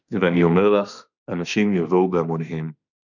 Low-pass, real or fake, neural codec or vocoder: 7.2 kHz; fake; autoencoder, 48 kHz, 32 numbers a frame, DAC-VAE, trained on Japanese speech